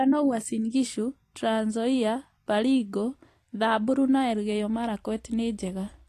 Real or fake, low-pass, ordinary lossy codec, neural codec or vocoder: fake; 14.4 kHz; AAC, 48 kbps; vocoder, 44.1 kHz, 128 mel bands every 256 samples, BigVGAN v2